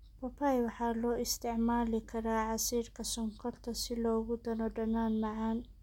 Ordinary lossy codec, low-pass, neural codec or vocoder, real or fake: MP3, 96 kbps; 19.8 kHz; none; real